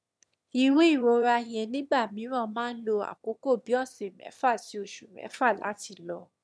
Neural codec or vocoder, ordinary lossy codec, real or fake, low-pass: autoencoder, 22.05 kHz, a latent of 192 numbers a frame, VITS, trained on one speaker; none; fake; none